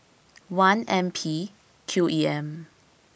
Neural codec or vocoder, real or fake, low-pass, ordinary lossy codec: none; real; none; none